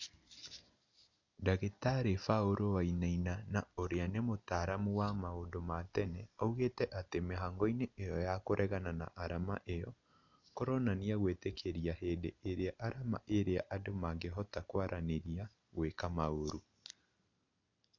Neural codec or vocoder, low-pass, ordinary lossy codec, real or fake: none; 7.2 kHz; none; real